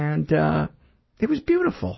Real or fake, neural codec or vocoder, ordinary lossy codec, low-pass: real; none; MP3, 24 kbps; 7.2 kHz